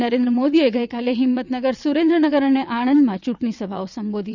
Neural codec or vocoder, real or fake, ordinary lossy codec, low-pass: vocoder, 22.05 kHz, 80 mel bands, WaveNeXt; fake; none; 7.2 kHz